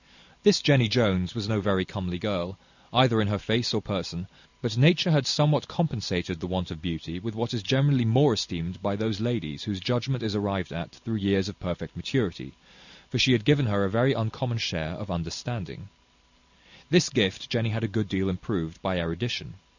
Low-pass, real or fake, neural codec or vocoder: 7.2 kHz; real; none